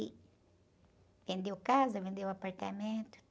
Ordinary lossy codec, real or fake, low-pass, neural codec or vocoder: none; real; none; none